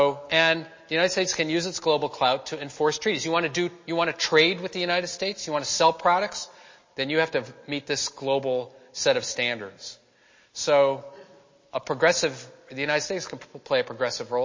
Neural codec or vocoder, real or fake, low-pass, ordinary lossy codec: none; real; 7.2 kHz; MP3, 32 kbps